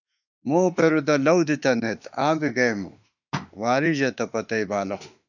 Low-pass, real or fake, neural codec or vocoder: 7.2 kHz; fake; autoencoder, 48 kHz, 32 numbers a frame, DAC-VAE, trained on Japanese speech